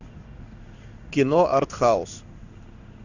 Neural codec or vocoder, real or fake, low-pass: codec, 16 kHz in and 24 kHz out, 1 kbps, XY-Tokenizer; fake; 7.2 kHz